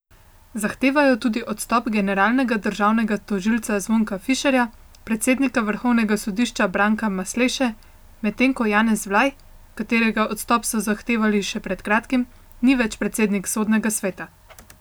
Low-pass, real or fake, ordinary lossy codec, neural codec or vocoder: none; real; none; none